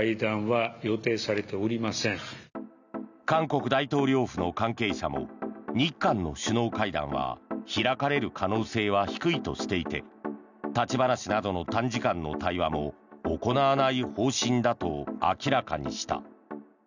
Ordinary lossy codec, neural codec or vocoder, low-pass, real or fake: none; none; 7.2 kHz; real